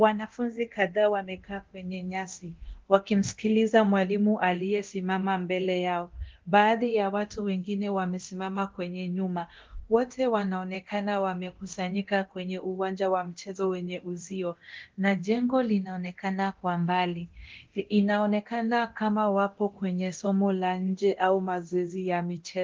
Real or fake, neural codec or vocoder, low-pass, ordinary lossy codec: fake; codec, 24 kHz, 0.9 kbps, DualCodec; 7.2 kHz; Opus, 16 kbps